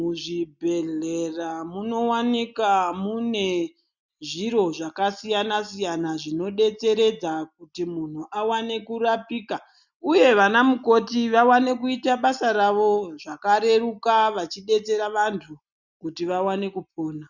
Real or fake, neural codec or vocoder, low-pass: real; none; 7.2 kHz